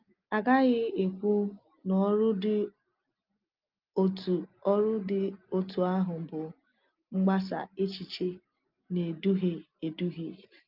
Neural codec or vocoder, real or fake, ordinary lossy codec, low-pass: none; real; Opus, 24 kbps; 5.4 kHz